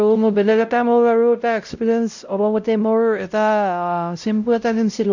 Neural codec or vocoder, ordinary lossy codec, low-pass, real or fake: codec, 16 kHz, 0.5 kbps, X-Codec, WavLM features, trained on Multilingual LibriSpeech; none; 7.2 kHz; fake